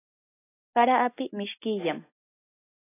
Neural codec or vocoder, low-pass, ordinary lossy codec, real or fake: none; 3.6 kHz; AAC, 16 kbps; real